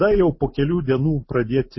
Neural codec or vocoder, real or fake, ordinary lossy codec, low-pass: none; real; MP3, 24 kbps; 7.2 kHz